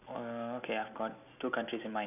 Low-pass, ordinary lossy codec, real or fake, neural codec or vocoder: 3.6 kHz; none; real; none